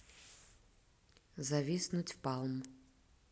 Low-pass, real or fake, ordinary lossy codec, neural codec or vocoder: none; real; none; none